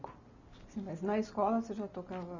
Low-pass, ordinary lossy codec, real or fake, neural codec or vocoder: 7.2 kHz; none; real; none